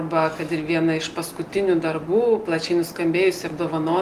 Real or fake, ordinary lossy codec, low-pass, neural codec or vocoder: real; Opus, 24 kbps; 14.4 kHz; none